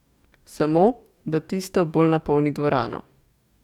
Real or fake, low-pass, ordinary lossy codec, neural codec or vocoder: fake; 19.8 kHz; none; codec, 44.1 kHz, 2.6 kbps, DAC